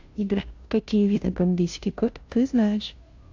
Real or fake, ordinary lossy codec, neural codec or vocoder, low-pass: fake; AAC, 48 kbps; codec, 16 kHz, 0.5 kbps, FunCodec, trained on Chinese and English, 25 frames a second; 7.2 kHz